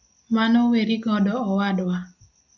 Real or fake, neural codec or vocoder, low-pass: real; none; 7.2 kHz